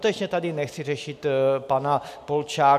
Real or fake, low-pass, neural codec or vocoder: real; 14.4 kHz; none